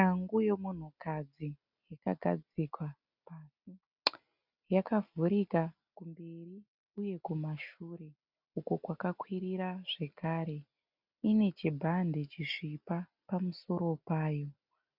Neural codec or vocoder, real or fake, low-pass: none; real; 5.4 kHz